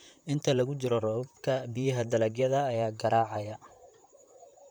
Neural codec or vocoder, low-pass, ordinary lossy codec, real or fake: vocoder, 44.1 kHz, 128 mel bands, Pupu-Vocoder; none; none; fake